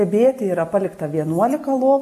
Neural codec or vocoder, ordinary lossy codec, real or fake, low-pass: none; MP3, 64 kbps; real; 14.4 kHz